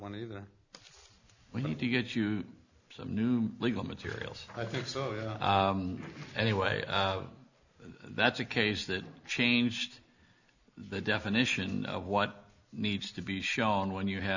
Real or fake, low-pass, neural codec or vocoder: real; 7.2 kHz; none